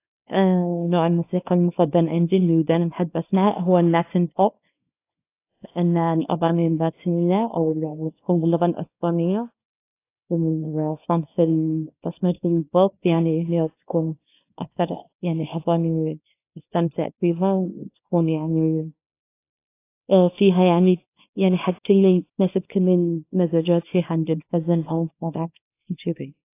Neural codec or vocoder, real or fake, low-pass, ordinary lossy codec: codec, 24 kHz, 0.9 kbps, WavTokenizer, small release; fake; 3.6 kHz; AAC, 24 kbps